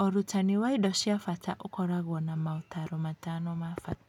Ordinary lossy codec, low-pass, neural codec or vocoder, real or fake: none; 19.8 kHz; none; real